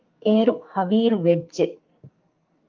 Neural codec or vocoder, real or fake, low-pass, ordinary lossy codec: codec, 44.1 kHz, 2.6 kbps, SNAC; fake; 7.2 kHz; Opus, 24 kbps